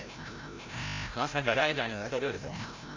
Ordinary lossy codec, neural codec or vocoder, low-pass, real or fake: MP3, 64 kbps; codec, 16 kHz, 0.5 kbps, FreqCodec, larger model; 7.2 kHz; fake